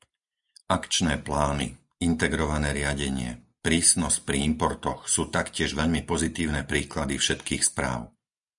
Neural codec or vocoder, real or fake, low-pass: none; real; 10.8 kHz